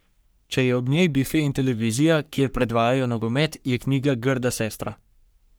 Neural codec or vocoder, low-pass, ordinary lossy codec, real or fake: codec, 44.1 kHz, 3.4 kbps, Pupu-Codec; none; none; fake